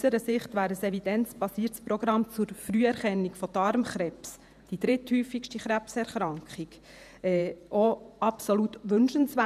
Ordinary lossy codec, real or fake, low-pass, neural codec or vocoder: none; fake; 14.4 kHz; vocoder, 48 kHz, 128 mel bands, Vocos